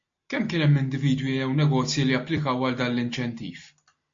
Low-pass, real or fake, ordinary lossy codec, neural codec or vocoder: 7.2 kHz; real; MP3, 48 kbps; none